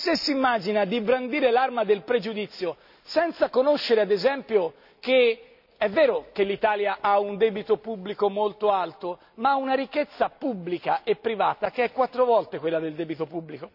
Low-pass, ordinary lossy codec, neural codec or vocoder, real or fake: 5.4 kHz; none; none; real